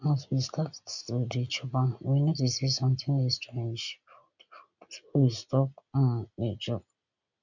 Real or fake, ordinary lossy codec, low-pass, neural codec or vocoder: real; none; 7.2 kHz; none